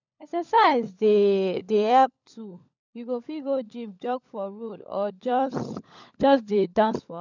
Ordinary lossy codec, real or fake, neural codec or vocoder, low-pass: none; fake; codec, 16 kHz, 16 kbps, FunCodec, trained on LibriTTS, 50 frames a second; 7.2 kHz